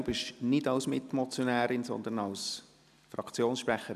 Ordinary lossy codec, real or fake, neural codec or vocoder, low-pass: none; real; none; 14.4 kHz